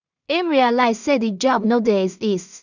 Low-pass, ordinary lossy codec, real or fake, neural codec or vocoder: 7.2 kHz; none; fake; codec, 16 kHz in and 24 kHz out, 0.4 kbps, LongCat-Audio-Codec, two codebook decoder